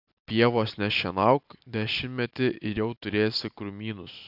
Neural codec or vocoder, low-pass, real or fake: none; 5.4 kHz; real